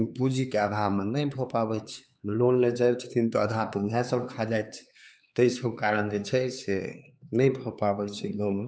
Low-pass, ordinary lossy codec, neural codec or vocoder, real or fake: none; none; codec, 16 kHz, 4 kbps, X-Codec, HuBERT features, trained on LibriSpeech; fake